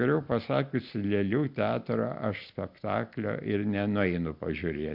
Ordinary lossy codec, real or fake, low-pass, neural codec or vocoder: MP3, 48 kbps; real; 5.4 kHz; none